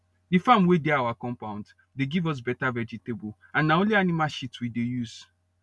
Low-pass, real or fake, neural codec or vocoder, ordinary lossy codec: none; real; none; none